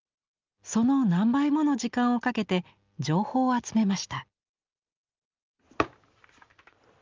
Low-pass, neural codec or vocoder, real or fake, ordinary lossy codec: 7.2 kHz; none; real; Opus, 32 kbps